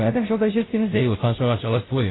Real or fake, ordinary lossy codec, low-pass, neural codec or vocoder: fake; AAC, 16 kbps; 7.2 kHz; codec, 16 kHz, 0.5 kbps, FunCodec, trained on Chinese and English, 25 frames a second